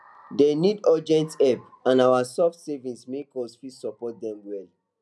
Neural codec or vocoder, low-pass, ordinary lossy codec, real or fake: none; none; none; real